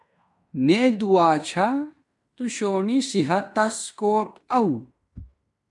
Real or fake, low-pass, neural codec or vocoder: fake; 10.8 kHz; codec, 16 kHz in and 24 kHz out, 0.9 kbps, LongCat-Audio-Codec, fine tuned four codebook decoder